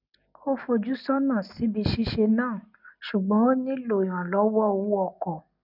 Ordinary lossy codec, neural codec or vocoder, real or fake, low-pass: none; none; real; 5.4 kHz